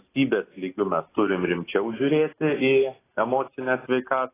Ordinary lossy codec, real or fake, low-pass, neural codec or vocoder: AAC, 16 kbps; real; 3.6 kHz; none